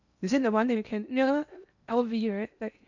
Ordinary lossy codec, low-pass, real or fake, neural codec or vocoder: none; 7.2 kHz; fake; codec, 16 kHz in and 24 kHz out, 0.8 kbps, FocalCodec, streaming, 65536 codes